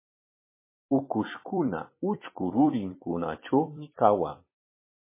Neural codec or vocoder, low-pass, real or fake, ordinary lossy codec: vocoder, 44.1 kHz, 128 mel bands every 256 samples, BigVGAN v2; 3.6 kHz; fake; MP3, 16 kbps